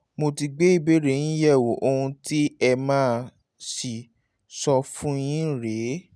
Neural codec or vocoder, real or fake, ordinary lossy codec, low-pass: none; real; none; none